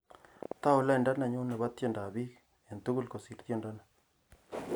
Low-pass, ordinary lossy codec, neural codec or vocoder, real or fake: none; none; none; real